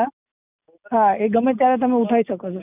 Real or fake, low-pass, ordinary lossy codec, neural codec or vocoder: real; 3.6 kHz; none; none